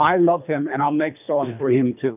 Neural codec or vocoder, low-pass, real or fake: codec, 24 kHz, 3 kbps, HILCodec; 3.6 kHz; fake